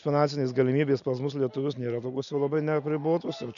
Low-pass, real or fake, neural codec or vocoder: 7.2 kHz; real; none